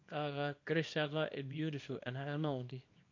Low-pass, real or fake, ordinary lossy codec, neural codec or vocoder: 7.2 kHz; fake; MP3, 48 kbps; codec, 24 kHz, 0.9 kbps, WavTokenizer, small release